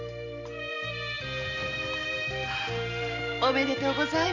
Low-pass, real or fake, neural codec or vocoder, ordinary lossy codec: 7.2 kHz; real; none; none